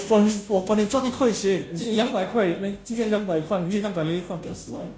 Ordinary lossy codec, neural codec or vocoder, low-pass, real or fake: none; codec, 16 kHz, 0.5 kbps, FunCodec, trained on Chinese and English, 25 frames a second; none; fake